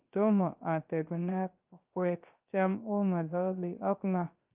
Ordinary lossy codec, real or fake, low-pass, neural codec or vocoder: Opus, 24 kbps; fake; 3.6 kHz; codec, 16 kHz, 0.3 kbps, FocalCodec